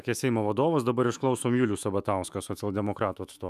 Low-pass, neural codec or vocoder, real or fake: 14.4 kHz; autoencoder, 48 kHz, 128 numbers a frame, DAC-VAE, trained on Japanese speech; fake